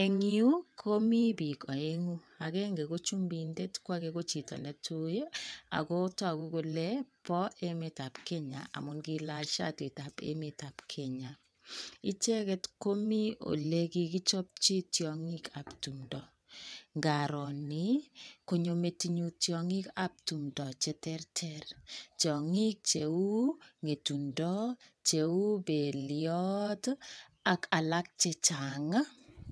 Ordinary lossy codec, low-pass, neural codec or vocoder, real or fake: none; none; vocoder, 22.05 kHz, 80 mel bands, WaveNeXt; fake